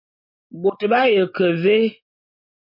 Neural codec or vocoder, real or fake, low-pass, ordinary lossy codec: none; real; 5.4 kHz; MP3, 32 kbps